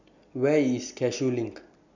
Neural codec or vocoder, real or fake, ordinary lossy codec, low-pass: none; real; none; 7.2 kHz